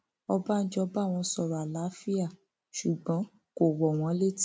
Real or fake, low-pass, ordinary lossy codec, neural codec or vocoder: real; none; none; none